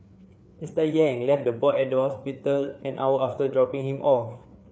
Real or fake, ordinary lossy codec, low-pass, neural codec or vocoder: fake; none; none; codec, 16 kHz, 4 kbps, FreqCodec, larger model